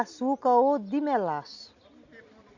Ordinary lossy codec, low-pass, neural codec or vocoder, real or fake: Opus, 64 kbps; 7.2 kHz; none; real